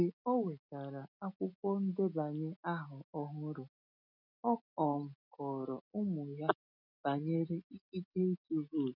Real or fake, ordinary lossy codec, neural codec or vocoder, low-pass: real; none; none; 5.4 kHz